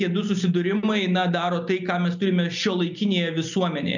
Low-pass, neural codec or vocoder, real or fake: 7.2 kHz; none; real